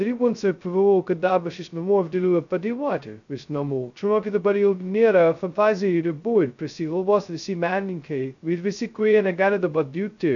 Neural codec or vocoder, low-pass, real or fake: codec, 16 kHz, 0.2 kbps, FocalCodec; 7.2 kHz; fake